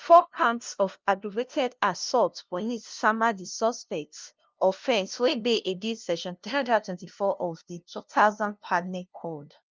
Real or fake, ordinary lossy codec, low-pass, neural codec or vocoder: fake; Opus, 24 kbps; 7.2 kHz; codec, 16 kHz, 0.5 kbps, FunCodec, trained on LibriTTS, 25 frames a second